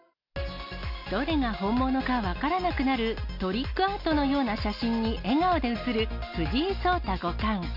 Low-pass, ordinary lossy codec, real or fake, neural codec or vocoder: 5.4 kHz; none; real; none